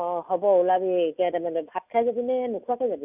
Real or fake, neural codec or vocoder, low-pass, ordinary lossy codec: real; none; 3.6 kHz; none